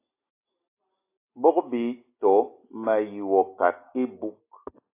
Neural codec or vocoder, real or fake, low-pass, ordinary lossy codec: none; real; 3.6 kHz; AAC, 24 kbps